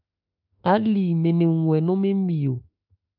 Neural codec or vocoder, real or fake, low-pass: autoencoder, 48 kHz, 32 numbers a frame, DAC-VAE, trained on Japanese speech; fake; 5.4 kHz